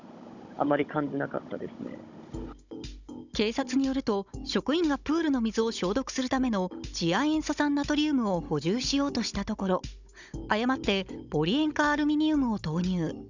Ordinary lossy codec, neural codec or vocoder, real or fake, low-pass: none; codec, 16 kHz, 16 kbps, FunCodec, trained on Chinese and English, 50 frames a second; fake; 7.2 kHz